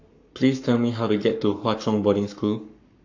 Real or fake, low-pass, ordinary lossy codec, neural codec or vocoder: fake; 7.2 kHz; AAC, 48 kbps; codec, 44.1 kHz, 7.8 kbps, Pupu-Codec